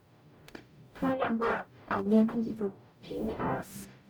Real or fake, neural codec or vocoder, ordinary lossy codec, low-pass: fake; codec, 44.1 kHz, 0.9 kbps, DAC; none; none